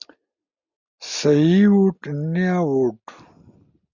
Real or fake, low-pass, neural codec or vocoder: real; 7.2 kHz; none